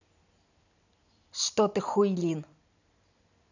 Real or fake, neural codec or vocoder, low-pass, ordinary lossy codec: real; none; 7.2 kHz; none